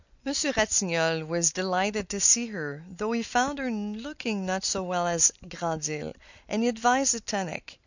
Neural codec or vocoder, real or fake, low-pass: none; real; 7.2 kHz